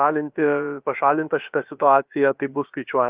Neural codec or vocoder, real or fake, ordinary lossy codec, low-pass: codec, 16 kHz, about 1 kbps, DyCAST, with the encoder's durations; fake; Opus, 24 kbps; 3.6 kHz